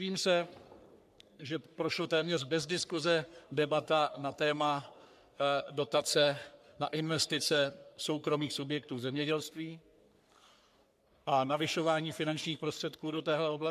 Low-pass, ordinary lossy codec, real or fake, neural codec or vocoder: 14.4 kHz; MP3, 96 kbps; fake; codec, 44.1 kHz, 3.4 kbps, Pupu-Codec